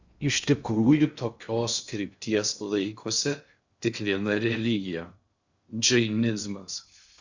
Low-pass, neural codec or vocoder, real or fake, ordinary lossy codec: 7.2 kHz; codec, 16 kHz in and 24 kHz out, 0.6 kbps, FocalCodec, streaming, 2048 codes; fake; Opus, 64 kbps